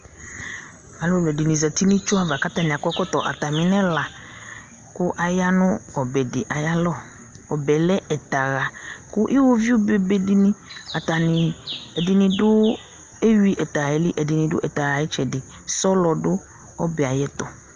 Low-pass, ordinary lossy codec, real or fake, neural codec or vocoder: 7.2 kHz; Opus, 24 kbps; real; none